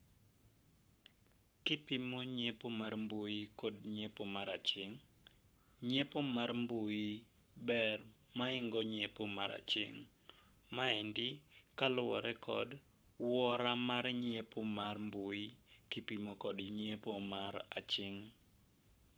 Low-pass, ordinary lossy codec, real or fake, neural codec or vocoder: none; none; fake; codec, 44.1 kHz, 7.8 kbps, Pupu-Codec